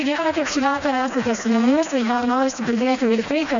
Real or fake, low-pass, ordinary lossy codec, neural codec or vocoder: fake; 7.2 kHz; MP3, 64 kbps; codec, 16 kHz, 1 kbps, FreqCodec, smaller model